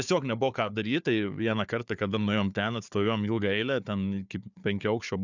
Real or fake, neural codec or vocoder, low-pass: fake; codec, 16 kHz, 4 kbps, FunCodec, trained on Chinese and English, 50 frames a second; 7.2 kHz